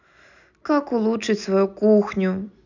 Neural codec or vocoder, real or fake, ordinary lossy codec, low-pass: none; real; none; 7.2 kHz